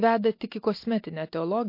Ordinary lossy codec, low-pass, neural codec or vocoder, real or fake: MP3, 32 kbps; 5.4 kHz; none; real